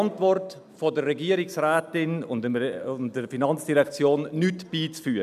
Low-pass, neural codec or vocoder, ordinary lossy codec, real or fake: 14.4 kHz; none; none; real